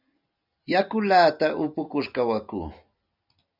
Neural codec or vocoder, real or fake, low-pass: none; real; 5.4 kHz